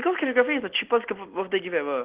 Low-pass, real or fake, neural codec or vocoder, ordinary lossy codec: 3.6 kHz; real; none; Opus, 16 kbps